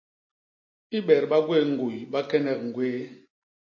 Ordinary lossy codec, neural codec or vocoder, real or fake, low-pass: MP3, 32 kbps; none; real; 7.2 kHz